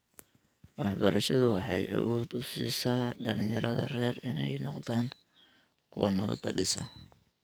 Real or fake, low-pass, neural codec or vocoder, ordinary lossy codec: fake; none; codec, 44.1 kHz, 2.6 kbps, SNAC; none